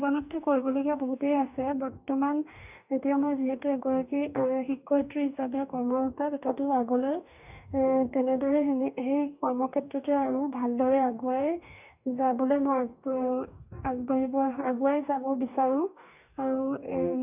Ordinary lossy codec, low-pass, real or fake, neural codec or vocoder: none; 3.6 kHz; fake; codec, 44.1 kHz, 2.6 kbps, DAC